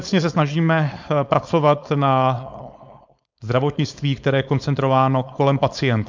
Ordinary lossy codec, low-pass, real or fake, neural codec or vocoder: MP3, 64 kbps; 7.2 kHz; fake; codec, 16 kHz, 4.8 kbps, FACodec